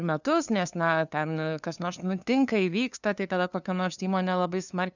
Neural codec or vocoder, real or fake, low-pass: codec, 16 kHz, 2 kbps, FunCodec, trained on LibriTTS, 25 frames a second; fake; 7.2 kHz